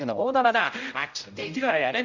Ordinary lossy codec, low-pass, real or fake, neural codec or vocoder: none; 7.2 kHz; fake; codec, 16 kHz, 0.5 kbps, X-Codec, HuBERT features, trained on general audio